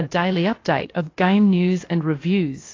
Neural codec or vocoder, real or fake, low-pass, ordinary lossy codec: codec, 16 kHz, about 1 kbps, DyCAST, with the encoder's durations; fake; 7.2 kHz; AAC, 32 kbps